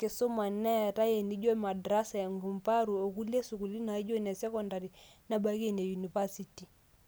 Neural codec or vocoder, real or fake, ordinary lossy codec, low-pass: none; real; none; none